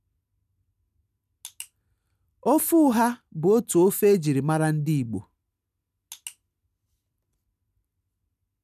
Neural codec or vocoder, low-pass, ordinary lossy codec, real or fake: none; 14.4 kHz; none; real